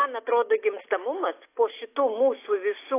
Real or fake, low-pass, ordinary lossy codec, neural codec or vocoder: real; 3.6 kHz; AAC, 24 kbps; none